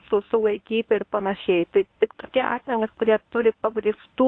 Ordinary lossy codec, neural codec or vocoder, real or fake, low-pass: AAC, 48 kbps; codec, 24 kHz, 0.9 kbps, WavTokenizer, medium speech release version 1; fake; 9.9 kHz